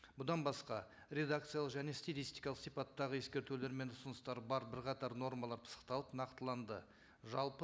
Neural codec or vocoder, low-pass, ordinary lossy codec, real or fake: none; none; none; real